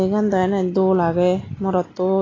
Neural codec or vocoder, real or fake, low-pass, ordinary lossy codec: none; real; 7.2 kHz; AAC, 32 kbps